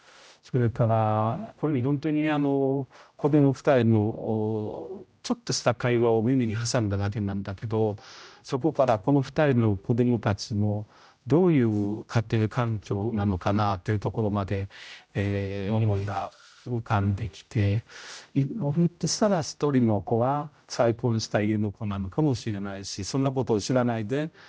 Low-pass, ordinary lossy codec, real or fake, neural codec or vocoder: none; none; fake; codec, 16 kHz, 0.5 kbps, X-Codec, HuBERT features, trained on general audio